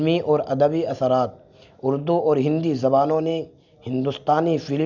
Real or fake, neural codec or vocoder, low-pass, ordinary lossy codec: real; none; 7.2 kHz; none